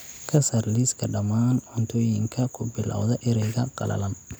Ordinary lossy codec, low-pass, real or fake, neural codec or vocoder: none; none; real; none